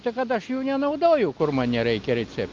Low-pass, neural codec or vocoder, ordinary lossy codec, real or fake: 7.2 kHz; none; Opus, 24 kbps; real